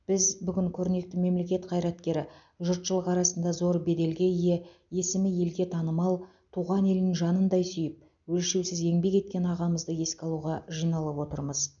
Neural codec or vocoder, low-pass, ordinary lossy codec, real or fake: none; 7.2 kHz; none; real